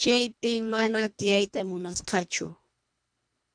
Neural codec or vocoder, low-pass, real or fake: codec, 24 kHz, 1.5 kbps, HILCodec; 9.9 kHz; fake